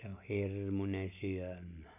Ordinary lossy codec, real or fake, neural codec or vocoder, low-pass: none; real; none; 3.6 kHz